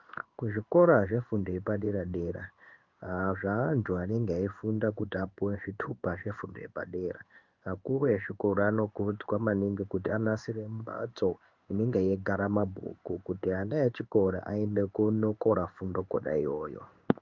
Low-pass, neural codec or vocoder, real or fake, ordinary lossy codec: 7.2 kHz; codec, 16 kHz in and 24 kHz out, 1 kbps, XY-Tokenizer; fake; Opus, 32 kbps